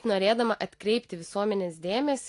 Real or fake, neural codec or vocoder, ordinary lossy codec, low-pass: real; none; AAC, 48 kbps; 10.8 kHz